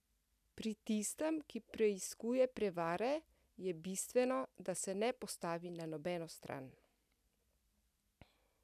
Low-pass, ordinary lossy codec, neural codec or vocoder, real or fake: 14.4 kHz; none; none; real